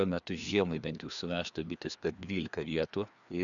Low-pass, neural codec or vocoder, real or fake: 7.2 kHz; codec, 16 kHz, 2 kbps, FreqCodec, larger model; fake